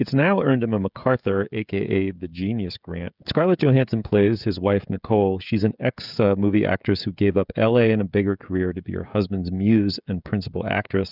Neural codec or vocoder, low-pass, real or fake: codec, 16 kHz, 16 kbps, FreqCodec, smaller model; 5.4 kHz; fake